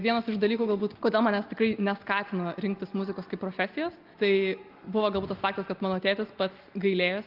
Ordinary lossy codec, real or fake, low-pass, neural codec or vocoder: Opus, 32 kbps; real; 5.4 kHz; none